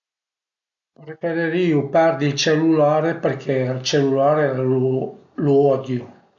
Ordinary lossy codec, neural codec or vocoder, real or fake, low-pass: MP3, 64 kbps; none; real; 7.2 kHz